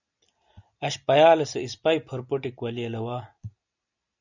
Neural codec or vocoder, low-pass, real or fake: none; 7.2 kHz; real